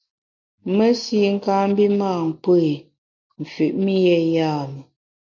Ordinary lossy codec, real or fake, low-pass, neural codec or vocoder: MP3, 48 kbps; real; 7.2 kHz; none